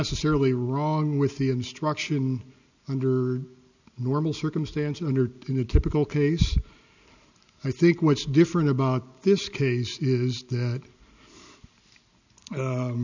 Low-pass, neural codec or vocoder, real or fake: 7.2 kHz; none; real